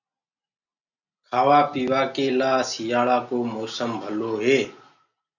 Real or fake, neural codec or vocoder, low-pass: real; none; 7.2 kHz